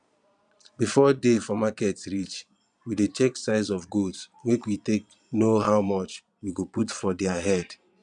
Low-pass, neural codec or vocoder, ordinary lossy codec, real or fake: 9.9 kHz; vocoder, 22.05 kHz, 80 mel bands, Vocos; none; fake